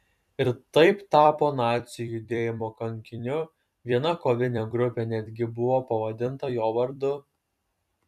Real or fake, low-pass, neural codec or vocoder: fake; 14.4 kHz; vocoder, 44.1 kHz, 128 mel bands every 256 samples, BigVGAN v2